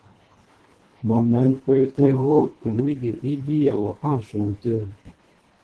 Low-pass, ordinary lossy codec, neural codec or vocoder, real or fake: 10.8 kHz; Opus, 16 kbps; codec, 24 kHz, 1.5 kbps, HILCodec; fake